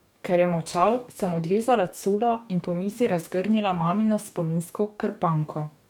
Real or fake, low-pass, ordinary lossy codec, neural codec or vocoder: fake; 19.8 kHz; none; codec, 44.1 kHz, 2.6 kbps, DAC